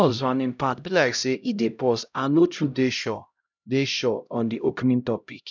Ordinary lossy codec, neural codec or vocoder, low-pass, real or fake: none; codec, 16 kHz, 0.5 kbps, X-Codec, HuBERT features, trained on LibriSpeech; 7.2 kHz; fake